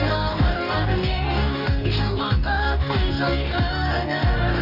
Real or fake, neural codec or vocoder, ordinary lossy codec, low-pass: fake; codec, 44.1 kHz, 2.6 kbps, DAC; Opus, 64 kbps; 5.4 kHz